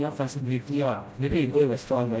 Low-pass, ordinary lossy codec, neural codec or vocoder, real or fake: none; none; codec, 16 kHz, 0.5 kbps, FreqCodec, smaller model; fake